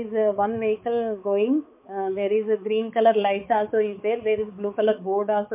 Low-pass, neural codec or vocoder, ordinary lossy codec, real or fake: 3.6 kHz; codec, 16 kHz, 4 kbps, X-Codec, HuBERT features, trained on balanced general audio; MP3, 24 kbps; fake